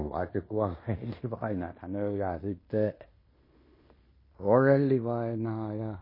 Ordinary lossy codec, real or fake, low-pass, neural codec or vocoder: MP3, 24 kbps; fake; 5.4 kHz; codec, 16 kHz in and 24 kHz out, 0.9 kbps, LongCat-Audio-Codec, fine tuned four codebook decoder